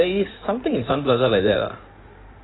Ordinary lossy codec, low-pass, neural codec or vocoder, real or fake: AAC, 16 kbps; 7.2 kHz; codec, 16 kHz in and 24 kHz out, 2.2 kbps, FireRedTTS-2 codec; fake